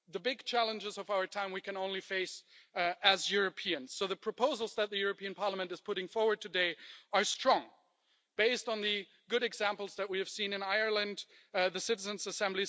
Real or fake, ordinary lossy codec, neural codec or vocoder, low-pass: real; none; none; none